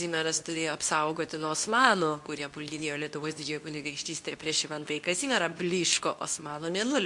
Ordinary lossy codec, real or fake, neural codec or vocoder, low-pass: MP3, 64 kbps; fake; codec, 24 kHz, 0.9 kbps, WavTokenizer, medium speech release version 2; 10.8 kHz